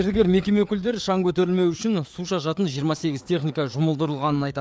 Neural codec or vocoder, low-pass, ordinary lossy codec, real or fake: codec, 16 kHz, 4 kbps, FreqCodec, larger model; none; none; fake